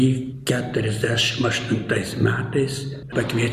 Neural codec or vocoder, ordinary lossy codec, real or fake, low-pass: none; Opus, 64 kbps; real; 14.4 kHz